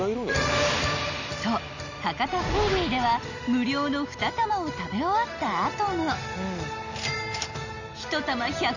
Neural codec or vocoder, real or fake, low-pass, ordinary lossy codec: none; real; 7.2 kHz; none